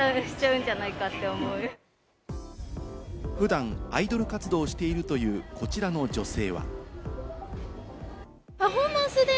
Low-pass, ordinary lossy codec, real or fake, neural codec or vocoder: none; none; real; none